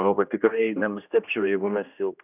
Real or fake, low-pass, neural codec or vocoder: fake; 3.6 kHz; codec, 16 kHz, 1 kbps, X-Codec, HuBERT features, trained on general audio